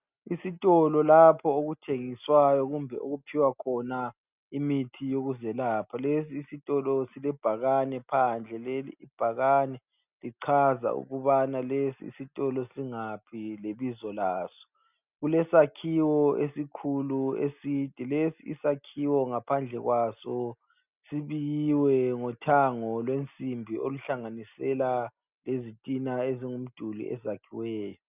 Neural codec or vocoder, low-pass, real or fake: none; 3.6 kHz; real